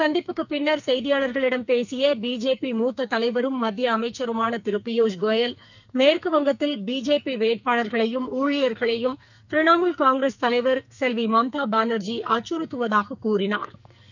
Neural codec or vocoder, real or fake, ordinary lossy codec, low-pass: codec, 44.1 kHz, 2.6 kbps, SNAC; fake; none; 7.2 kHz